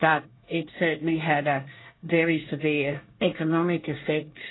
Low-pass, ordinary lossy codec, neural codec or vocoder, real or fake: 7.2 kHz; AAC, 16 kbps; codec, 24 kHz, 1 kbps, SNAC; fake